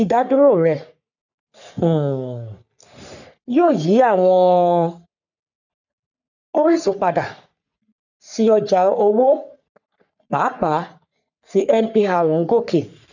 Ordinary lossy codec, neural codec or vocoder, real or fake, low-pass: none; codec, 44.1 kHz, 3.4 kbps, Pupu-Codec; fake; 7.2 kHz